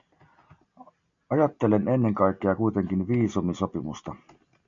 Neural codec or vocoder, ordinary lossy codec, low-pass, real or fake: none; MP3, 64 kbps; 7.2 kHz; real